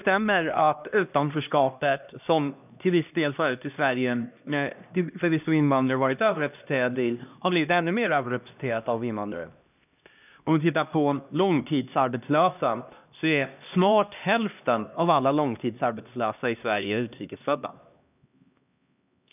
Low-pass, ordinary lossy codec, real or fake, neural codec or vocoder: 3.6 kHz; none; fake; codec, 16 kHz, 1 kbps, X-Codec, HuBERT features, trained on LibriSpeech